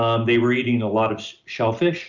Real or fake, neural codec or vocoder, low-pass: real; none; 7.2 kHz